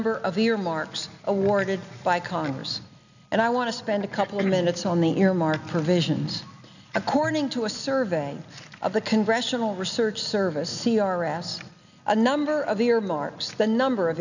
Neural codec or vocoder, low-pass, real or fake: none; 7.2 kHz; real